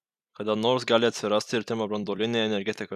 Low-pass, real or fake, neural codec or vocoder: 14.4 kHz; fake; vocoder, 44.1 kHz, 128 mel bands every 256 samples, BigVGAN v2